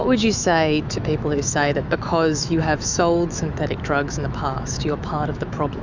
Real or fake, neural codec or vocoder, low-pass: fake; autoencoder, 48 kHz, 128 numbers a frame, DAC-VAE, trained on Japanese speech; 7.2 kHz